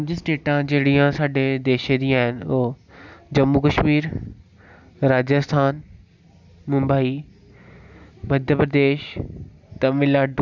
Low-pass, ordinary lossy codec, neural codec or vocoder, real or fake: 7.2 kHz; none; none; real